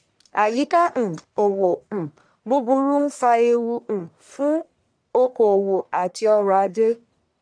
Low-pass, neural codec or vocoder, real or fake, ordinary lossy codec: 9.9 kHz; codec, 44.1 kHz, 1.7 kbps, Pupu-Codec; fake; none